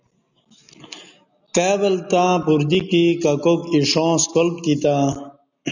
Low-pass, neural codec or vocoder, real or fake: 7.2 kHz; none; real